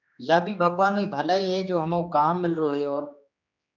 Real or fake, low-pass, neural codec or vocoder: fake; 7.2 kHz; codec, 16 kHz, 2 kbps, X-Codec, HuBERT features, trained on general audio